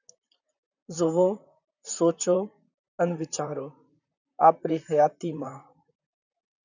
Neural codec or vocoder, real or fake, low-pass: vocoder, 44.1 kHz, 128 mel bands, Pupu-Vocoder; fake; 7.2 kHz